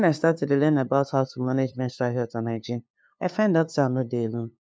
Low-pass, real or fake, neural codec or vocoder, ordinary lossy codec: none; fake; codec, 16 kHz, 2 kbps, FunCodec, trained on LibriTTS, 25 frames a second; none